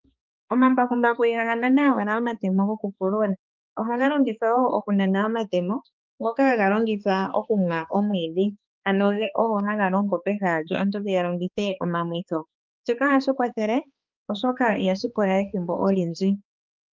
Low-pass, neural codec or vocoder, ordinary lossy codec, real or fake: 7.2 kHz; codec, 16 kHz, 2 kbps, X-Codec, HuBERT features, trained on balanced general audio; Opus, 24 kbps; fake